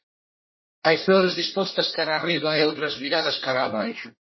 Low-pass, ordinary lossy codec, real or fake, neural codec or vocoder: 7.2 kHz; MP3, 24 kbps; fake; codec, 24 kHz, 1 kbps, SNAC